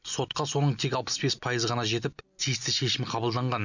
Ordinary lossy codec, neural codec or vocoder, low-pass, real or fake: none; none; 7.2 kHz; real